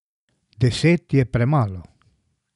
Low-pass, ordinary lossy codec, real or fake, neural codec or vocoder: 10.8 kHz; none; real; none